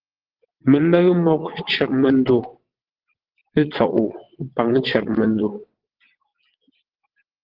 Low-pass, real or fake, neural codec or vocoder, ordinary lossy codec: 5.4 kHz; fake; vocoder, 22.05 kHz, 80 mel bands, WaveNeXt; Opus, 16 kbps